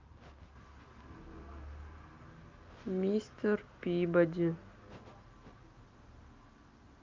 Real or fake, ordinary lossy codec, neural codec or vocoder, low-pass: real; Opus, 24 kbps; none; 7.2 kHz